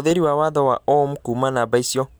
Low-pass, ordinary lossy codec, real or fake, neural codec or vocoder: none; none; real; none